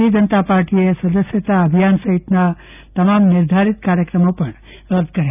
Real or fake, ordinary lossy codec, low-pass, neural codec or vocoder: real; none; 3.6 kHz; none